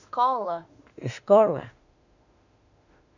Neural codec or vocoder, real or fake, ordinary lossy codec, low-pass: autoencoder, 48 kHz, 32 numbers a frame, DAC-VAE, trained on Japanese speech; fake; none; 7.2 kHz